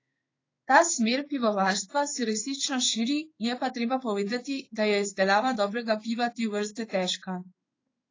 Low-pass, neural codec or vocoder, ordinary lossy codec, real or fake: 7.2 kHz; codec, 16 kHz in and 24 kHz out, 1 kbps, XY-Tokenizer; AAC, 32 kbps; fake